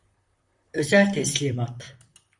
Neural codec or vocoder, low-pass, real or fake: vocoder, 44.1 kHz, 128 mel bands, Pupu-Vocoder; 10.8 kHz; fake